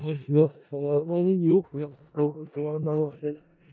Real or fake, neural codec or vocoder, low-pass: fake; codec, 16 kHz in and 24 kHz out, 0.4 kbps, LongCat-Audio-Codec, four codebook decoder; 7.2 kHz